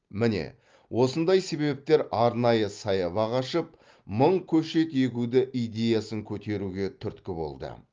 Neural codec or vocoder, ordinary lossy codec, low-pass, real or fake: none; Opus, 32 kbps; 7.2 kHz; real